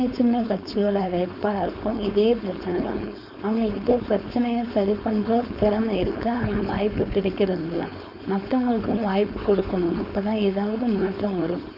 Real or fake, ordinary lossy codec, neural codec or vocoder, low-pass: fake; none; codec, 16 kHz, 4.8 kbps, FACodec; 5.4 kHz